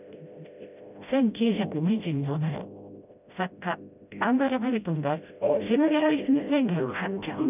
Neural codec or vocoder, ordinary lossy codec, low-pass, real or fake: codec, 16 kHz, 0.5 kbps, FreqCodec, smaller model; none; 3.6 kHz; fake